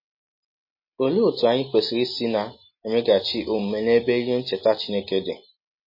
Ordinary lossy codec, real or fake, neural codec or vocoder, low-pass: MP3, 24 kbps; real; none; 5.4 kHz